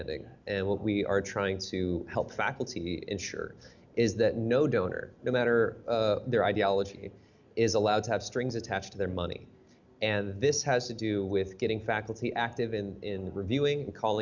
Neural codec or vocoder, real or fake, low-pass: none; real; 7.2 kHz